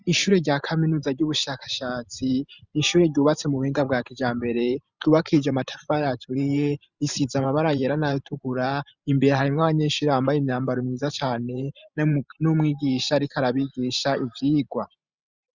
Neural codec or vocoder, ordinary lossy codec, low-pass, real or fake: vocoder, 44.1 kHz, 128 mel bands every 512 samples, BigVGAN v2; Opus, 64 kbps; 7.2 kHz; fake